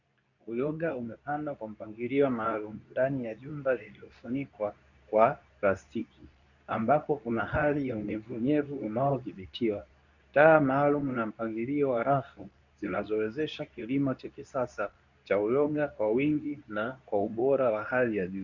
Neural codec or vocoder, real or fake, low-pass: codec, 24 kHz, 0.9 kbps, WavTokenizer, medium speech release version 2; fake; 7.2 kHz